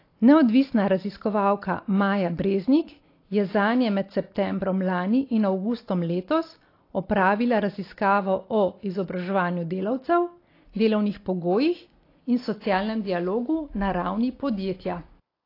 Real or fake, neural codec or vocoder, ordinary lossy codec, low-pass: real; none; AAC, 32 kbps; 5.4 kHz